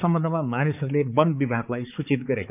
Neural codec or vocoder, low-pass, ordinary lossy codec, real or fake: codec, 16 kHz, 4 kbps, X-Codec, HuBERT features, trained on balanced general audio; 3.6 kHz; none; fake